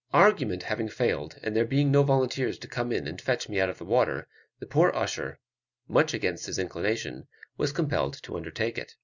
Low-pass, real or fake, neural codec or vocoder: 7.2 kHz; real; none